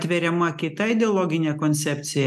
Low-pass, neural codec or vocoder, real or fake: 14.4 kHz; none; real